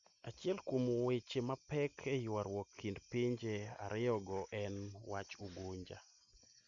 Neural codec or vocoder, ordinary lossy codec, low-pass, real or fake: none; Opus, 64 kbps; 7.2 kHz; real